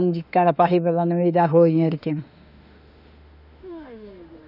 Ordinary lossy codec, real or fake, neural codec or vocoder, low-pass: none; fake; autoencoder, 48 kHz, 32 numbers a frame, DAC-VAE, trained on Japanese speech; 5.4 kHz